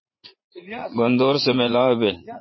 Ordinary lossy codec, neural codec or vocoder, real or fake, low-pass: MP3, 24 kbps; vocoder, 44.1 kHz, 80 mel bands, Vocos; fake; 7.2 kHz